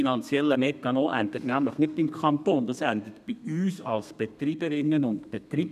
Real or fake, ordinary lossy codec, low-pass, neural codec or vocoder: fake; none; 14.4 kHz; codec, 32 kHz, 1.9 kbps, SNAC